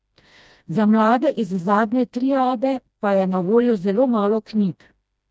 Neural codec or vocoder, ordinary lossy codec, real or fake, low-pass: codec, 16 kHz, 1 kbps, FreqCodec, smaller model; none; fake; none